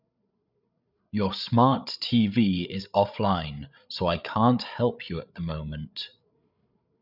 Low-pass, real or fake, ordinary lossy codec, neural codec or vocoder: 5.4 kHz; fake; none; codec, 16 kHz, 8 kbps, FreqCodec, larger model